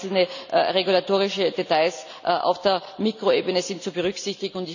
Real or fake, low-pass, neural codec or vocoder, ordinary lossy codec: real; 7.2 kHz; none; none